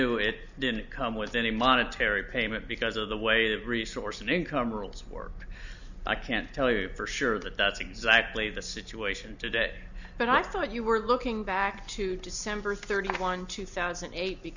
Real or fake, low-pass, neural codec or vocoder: real; 7.2 kHz; none